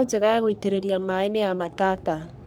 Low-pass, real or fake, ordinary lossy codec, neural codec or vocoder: none; fake; none; codec, 44.1 kHz, 3.4 kbps, Pupu-Codec